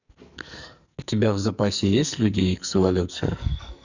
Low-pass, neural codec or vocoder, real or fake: 7.2 kHz; codec, 44.1 kHz, 2.6 kbps, SNAC; fake